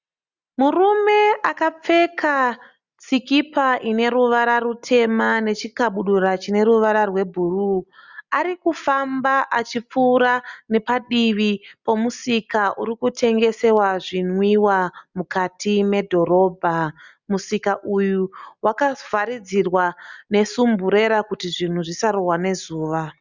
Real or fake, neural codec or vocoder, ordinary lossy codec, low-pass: real; none; Opus, 64 kbps; 7.2 kHz